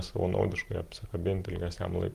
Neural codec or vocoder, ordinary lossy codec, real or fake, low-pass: none; Opus, 24 kbps; real; 14.4 kHz